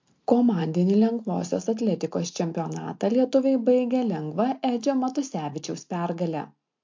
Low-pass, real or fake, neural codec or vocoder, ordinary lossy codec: 7.2 kHz; real; none; MP3, 48 kbps